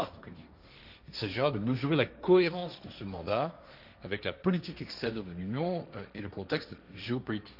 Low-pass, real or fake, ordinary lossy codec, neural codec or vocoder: 5.4 kHz; fake; none; codec, 16 kHz, 1.1 kbps, Voila-Tokenizer